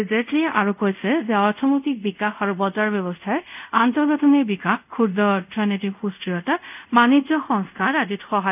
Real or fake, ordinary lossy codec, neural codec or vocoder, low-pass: fake; none; codec, 24 kHz, 0.5 kbps, DualCodec; 3.6 kHz